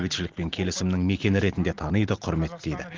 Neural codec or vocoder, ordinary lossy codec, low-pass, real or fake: none; Opus, 16 kbps; 7.2 kHz; real